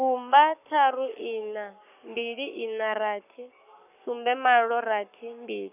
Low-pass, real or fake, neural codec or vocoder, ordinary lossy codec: 3.6 kHz; fake; codec, 44.1 kHz, 7.8 kbps, Pupu-Codec; none